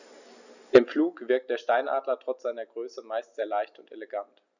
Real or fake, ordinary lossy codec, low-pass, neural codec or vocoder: real; none; 7.2 kHz; none